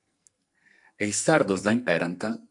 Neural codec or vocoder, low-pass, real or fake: codec, 44.1 kHz, 2.6 kbps, SNAC; 10.8 kHz; fake